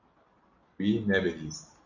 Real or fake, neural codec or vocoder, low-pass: real; none; 7.2 kHz